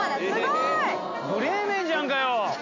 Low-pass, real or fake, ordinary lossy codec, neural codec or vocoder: 7.2 kHz; real; AAC, 48 kbps; none